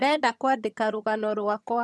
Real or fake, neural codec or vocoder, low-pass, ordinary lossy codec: fake; vocoder, 22.05 kHz, 80 mel bands, HiFi-GAN; none; none